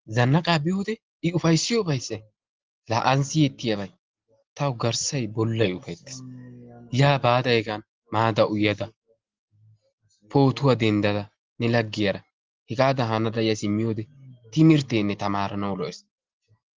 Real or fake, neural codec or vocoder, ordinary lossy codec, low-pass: real; none; Opus, 16 kbps; 7.2 kHz